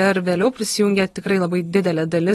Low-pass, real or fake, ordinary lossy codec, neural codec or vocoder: 19.8 kHz; fake; AAC, 32 kbps; vocoder, 44.1 kHz, 128 mel bands every 512 samples, BigVGAN v2